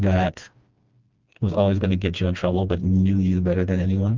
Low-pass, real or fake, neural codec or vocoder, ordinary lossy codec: 7.2 kHz; fake; codec, 16 kHz, 2 kbps, FreqCodec, smaller model; Opus, 32 kbps